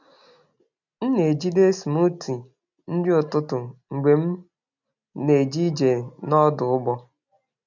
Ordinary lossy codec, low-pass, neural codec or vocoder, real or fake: none; 7.2 kHz; none; real